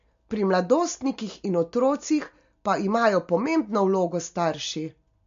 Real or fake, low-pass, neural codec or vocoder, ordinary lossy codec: real; 7.2 kHz; none; MP3, 48 kbps